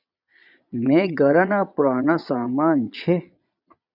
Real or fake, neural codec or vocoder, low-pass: fake; vocoder, 22.05 kHz, 80 mel bands, Vocos; 5.4 kHz